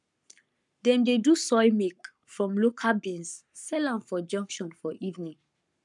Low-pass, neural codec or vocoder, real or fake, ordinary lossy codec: 10.8 kHz; codec, 44.1 kHz, 7.8 kbps, Pupu-Codec; fake; none